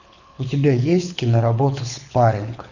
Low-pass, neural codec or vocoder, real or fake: 7.2 kHz; codec, 24 kHz, 6 kbps, HILCodec; fake